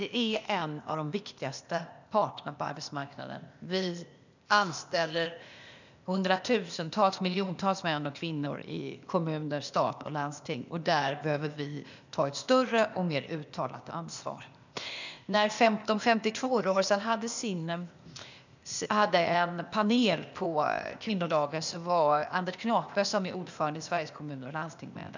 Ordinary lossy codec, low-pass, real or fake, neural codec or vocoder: none; 7.2 kHz; fake; codec, 16 kHz, 0.8 kbps, ZipCodec